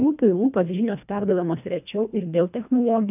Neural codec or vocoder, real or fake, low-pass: codec, 24 kHz, 1.5 kbps, HILCodec; fake; 3.6 kHz